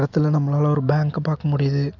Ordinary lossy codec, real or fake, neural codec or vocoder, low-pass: none; real; none; 7.2 kHz